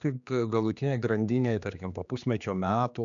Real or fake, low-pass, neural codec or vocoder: fake; 7.2 kHz; codec, 16 kHz, 2 kbps, X-Codec, HuBERT features, trained on general audio